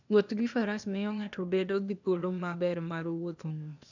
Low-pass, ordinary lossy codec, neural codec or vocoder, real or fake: 7.2 kHz; none; codec, 16 kHz, 0.8 kbps, ZipCodec; fake